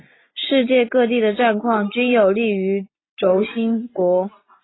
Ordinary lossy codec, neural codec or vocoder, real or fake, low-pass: AAC, 16 kbps; none; real; 7.2 kHz